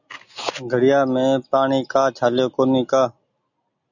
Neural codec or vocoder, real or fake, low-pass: none; real; 7.2 kHz